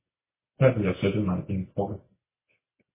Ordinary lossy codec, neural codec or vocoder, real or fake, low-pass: MP3, 16 kbps; none; real; 3.6 kHz